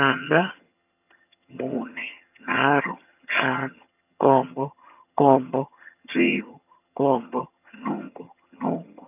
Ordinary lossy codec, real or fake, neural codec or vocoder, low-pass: none; fake; vocoder, 22.05 kHz, 80 mel bands, HiFi-GAN; 3.6 kHz